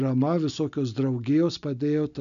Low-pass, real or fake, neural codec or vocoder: 7.2 kHz; real; none